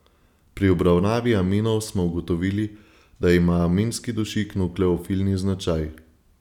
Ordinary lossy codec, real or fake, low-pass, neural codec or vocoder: none; real; 19.8 kHz; none